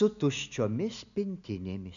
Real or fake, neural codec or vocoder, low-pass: real; none; 7.2 kHz